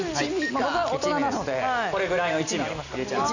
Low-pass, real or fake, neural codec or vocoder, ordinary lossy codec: 7.2 kHz; real; none; none